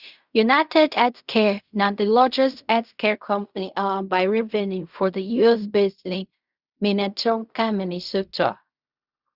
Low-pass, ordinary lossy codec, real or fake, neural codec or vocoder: 5.4 kHz; Opus, 64 kbps; fake; codec, 16 kHz in and 24 kHz out, 0.4 kbps, LongCat-Audio-Codec, fine tuned four codebook decoder